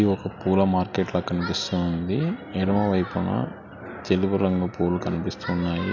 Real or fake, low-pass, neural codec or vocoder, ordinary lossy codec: real; 7.2 kHz; none; none